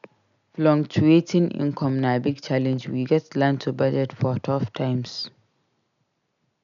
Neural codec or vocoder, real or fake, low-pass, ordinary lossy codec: none; real; 7.2 kHz; none